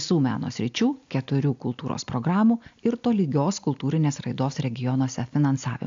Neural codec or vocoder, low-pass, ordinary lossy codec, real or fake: none; 7.2 kHz; AAC, 64 kbps; real